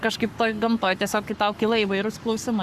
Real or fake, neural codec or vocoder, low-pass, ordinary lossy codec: fake; codec, 44.1 kHz, 7.8 kbps, Pupu-Codec; 14.4 kHz; Opus, 64 kbps